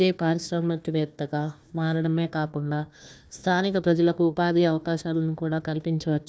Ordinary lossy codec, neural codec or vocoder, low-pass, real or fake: none; codec, 16 kHz, 1 kbps, FunCodec, trained on Chinese and English, 50 frames a second; none; fake